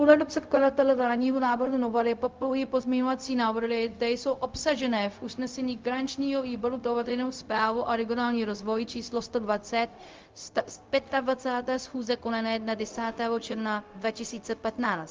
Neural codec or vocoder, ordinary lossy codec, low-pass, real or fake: codec, 16 kHz, 0.4 kbps, LongCat-Audio-Codec; Opus, 32 kbps; 7.2 kHz; fake